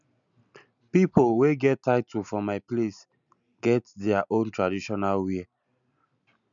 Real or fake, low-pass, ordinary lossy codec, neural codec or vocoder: real; 7.2 kHz; none; none